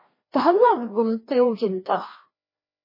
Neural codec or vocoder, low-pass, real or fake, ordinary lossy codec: codec, 16 kHz, 1 kbps, FreqCodec, larger model; 5.4 kHz; fake; MP3, 24 kbps